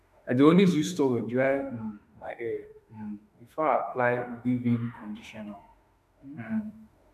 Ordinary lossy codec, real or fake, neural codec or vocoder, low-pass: none; fake; autoencoder, 48 kHz, 32 numbers a frame, DAC-VAE, trained on Japanese speech; 14.4 kHz